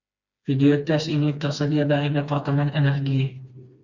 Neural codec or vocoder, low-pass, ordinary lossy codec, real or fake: codec, 16 kHz, 2 kbps, FreqCodec, smaller model; 7.2 kHz; Opus, 64 kbps; fake